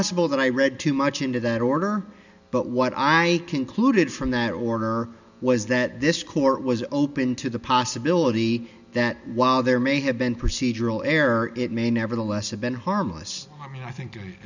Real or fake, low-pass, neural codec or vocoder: real; 7.2 kHz; none